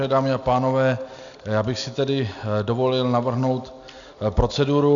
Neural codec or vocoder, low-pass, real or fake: none; 7.2 kHz; real